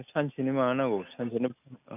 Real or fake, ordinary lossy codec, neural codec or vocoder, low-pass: real; none; none; 3.6 kHz